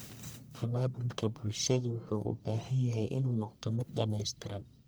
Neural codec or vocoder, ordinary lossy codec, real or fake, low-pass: codec, 44.1 kHz, 1.7 kbps, Pupu-Codec; none; fake; none